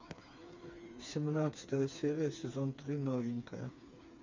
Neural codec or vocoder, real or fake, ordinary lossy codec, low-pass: codec, 16 kHz, 4 kbps, FreqCodec, smaller model; fake; MP3, 64 kbps; 7.2 kHz